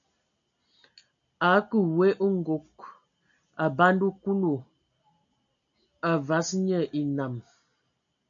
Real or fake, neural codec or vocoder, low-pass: real; none; 7.2 kHz